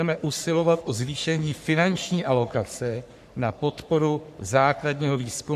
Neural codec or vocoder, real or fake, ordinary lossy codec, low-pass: codec, 44.1 kHz, 3.4 kbps, Pupu-Codec; fake; MP3, 96 kbps; 14.4 kHz